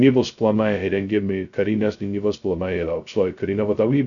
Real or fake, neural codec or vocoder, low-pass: fake; codec, 16 kHz, 0.2 kbps, FocalCodec; 7.2 kHz